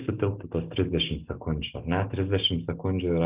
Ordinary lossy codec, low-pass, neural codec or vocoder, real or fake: Opus, 24 kbps; 3.6 kHz; none; real